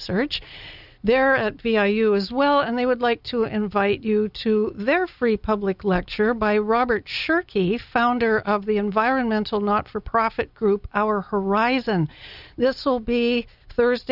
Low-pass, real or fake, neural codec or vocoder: 5.4 kHz; real; none